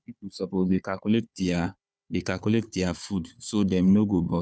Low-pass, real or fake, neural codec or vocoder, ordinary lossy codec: none; fake; codec, 16 kHz, 4 kbps, FunCodec, trained on Chinese and English, 50 frames a second; none